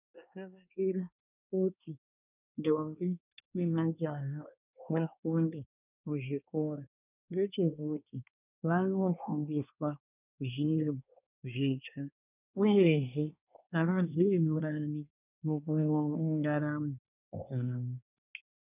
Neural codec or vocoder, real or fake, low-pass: codec, 24 kHz, 1 kbps, SNAC; fake; 3.6 kHz